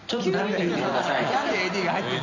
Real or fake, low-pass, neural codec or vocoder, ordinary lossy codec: real; 7.2 kHz; none; none